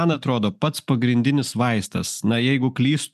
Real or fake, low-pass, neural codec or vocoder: real; 14.4 kHz; none